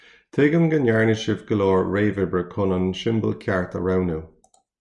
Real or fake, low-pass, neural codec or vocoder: real; 10.8 kHz; none